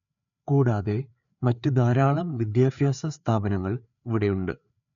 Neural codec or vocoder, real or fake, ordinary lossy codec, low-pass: codec, 16 kHz, 4 kbps, FreqCodec, larger model; fake; none; 7.2 kHz